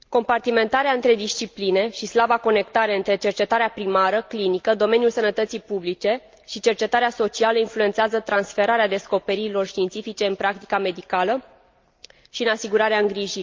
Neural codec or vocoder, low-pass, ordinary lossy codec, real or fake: none; 7.2 kHz; Opus, 24 kbps; real